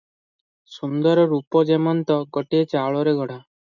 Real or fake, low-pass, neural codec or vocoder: real; 7.2 kHz; none